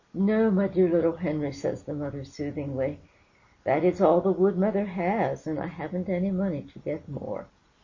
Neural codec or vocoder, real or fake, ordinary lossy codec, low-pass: none; real; MP3, 32 kbps; 7.2 kHz